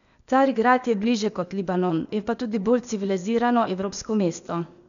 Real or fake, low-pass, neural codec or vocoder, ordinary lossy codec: fake; 7.2 kHz; codec, 16 kHz, 0.8 kbps, ZipCodec; none